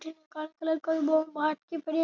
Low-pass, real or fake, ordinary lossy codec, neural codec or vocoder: 7.2 kHz; real; none; none